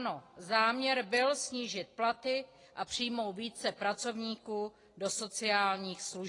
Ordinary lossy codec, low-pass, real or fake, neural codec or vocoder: AAC, 32 kbps; 10.8 kHz; real; none